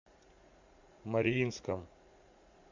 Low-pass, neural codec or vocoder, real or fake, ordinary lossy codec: 7.2 kHz; vocoder, 44.1 kHz, 80 mel bands, Vocos; fake; MP3, 64 kbps